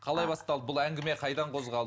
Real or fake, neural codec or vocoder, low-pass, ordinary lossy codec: real; none; none; none